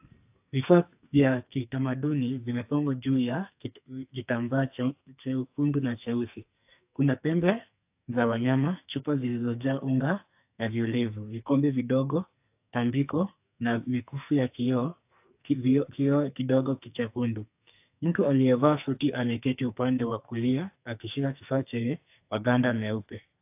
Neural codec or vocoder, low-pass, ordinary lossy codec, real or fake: codec, 44.1 kHz, 2.6 kbps, SNAC; 3.6 kHz; AAC, 32 kbps; fake